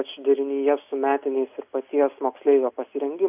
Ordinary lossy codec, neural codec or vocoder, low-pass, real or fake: AAC, 32 kbps; none; 3.6 kHz; real